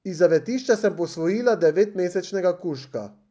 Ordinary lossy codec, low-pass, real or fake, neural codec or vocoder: none; none; real; none